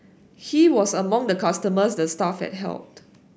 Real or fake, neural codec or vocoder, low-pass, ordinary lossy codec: real; none; none; none